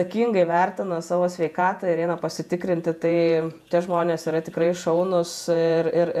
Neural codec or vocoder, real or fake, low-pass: vocoder, 48 kHz, 128 mel bands, Vocos; fake; 14.4 kHz